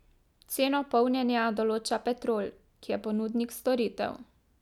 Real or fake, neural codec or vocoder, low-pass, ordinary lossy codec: real; none; 19.8 kHz; none